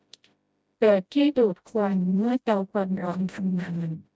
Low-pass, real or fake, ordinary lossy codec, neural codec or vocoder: none; fake; none; codec, 16 kHz, 0.5 kbps, FreqCodec, smaller model